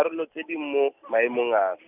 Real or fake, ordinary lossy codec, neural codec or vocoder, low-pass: real; none; none; 3.6 kHz